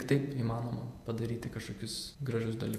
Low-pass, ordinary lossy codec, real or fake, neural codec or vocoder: 14.4 kHz; AAC, 96 kbps; fake; vocoder, 48 kHz, 128 mel bands, Vocos